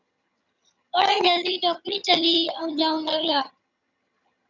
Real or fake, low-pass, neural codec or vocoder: fake; 7.2 kHz; vocoder, 22.05 kHz, 80 mel bands, HiFi-GAN